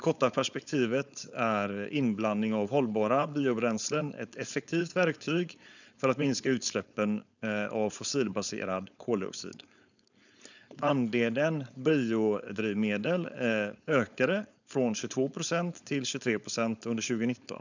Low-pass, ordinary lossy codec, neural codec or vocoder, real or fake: 7.2 kHz; none; codec, 16 kHz, 4.8 kbps, FACodec; fake